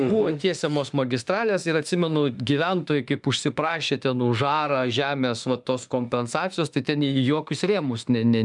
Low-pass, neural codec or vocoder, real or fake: 10.8 kHz; autoencoder, 48 kHz, 32 numbers a frame, DAC-VAE, trained on Japanese speech; fake